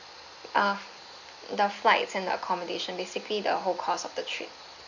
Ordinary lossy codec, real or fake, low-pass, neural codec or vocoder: none; real; 7.2 kHz; none